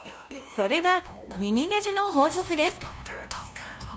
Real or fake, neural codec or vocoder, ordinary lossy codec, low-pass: fake; codec, 16 kHz, 0.5 kbps, FunCodec, trained on LibriTTS, 25 frames a second; none; none